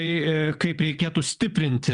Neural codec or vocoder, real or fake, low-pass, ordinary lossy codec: vocoder, 22.05 kHz, 80 mel bands, WaveNeXt; fake; 9.9 kHz; Opus, 64 kbps